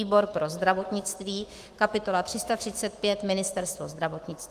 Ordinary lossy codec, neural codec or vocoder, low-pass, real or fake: Opus, 24 kbps; autoencoder, 48 kHz, 128 numbers a frame, DAC-VAE, trained on Japanese speech; 14.4 kHz; fake